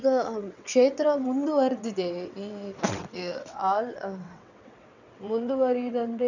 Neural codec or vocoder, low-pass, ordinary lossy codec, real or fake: vocoder, 22.05 kHz, 80 mel bands, WaveNeXt; 7.2 kHz; none; fake